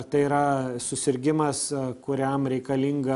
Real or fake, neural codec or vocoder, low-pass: real; none; 10.8 kHz